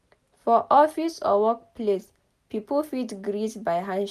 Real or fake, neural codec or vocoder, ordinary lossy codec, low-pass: real; none; none; 14.4 kHz